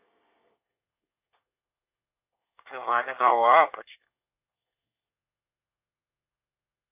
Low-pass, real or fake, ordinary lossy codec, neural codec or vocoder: 3.6 kHz; fake; AAC, 32 kbps; codec, 24 kHz, 1 kbps, SNAC